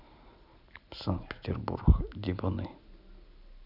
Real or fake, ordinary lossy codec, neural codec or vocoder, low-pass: real; none; none; 5.4 kHz